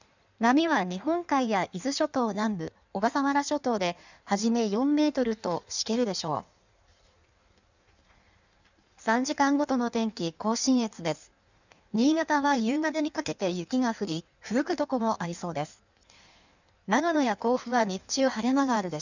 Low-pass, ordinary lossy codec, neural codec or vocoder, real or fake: 7.2 kHz; none; codec, 16 kHz in and 24 kHz out, 1.1 kbps, FireRedTTS-2 codec; fake